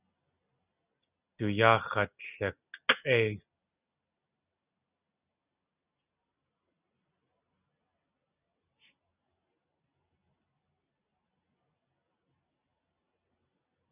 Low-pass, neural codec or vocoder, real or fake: 3.6 kHz; none; real